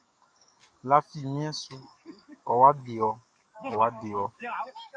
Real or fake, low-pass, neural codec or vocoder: fake; 9.9 kHz; codec, 44.1 kHz, 7.8 kbps, DAC